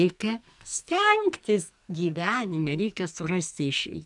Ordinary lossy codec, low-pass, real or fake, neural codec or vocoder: MP3, 96 kbps; 10.8 kHz; fake; codec, 44.1 kHz, 2.6 kbps, SNAC